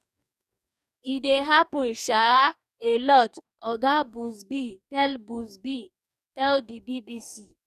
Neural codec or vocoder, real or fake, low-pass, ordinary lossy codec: codec, 44.1 kHz, 2.6 kbps, DAC; fake; 14.4 kHz; none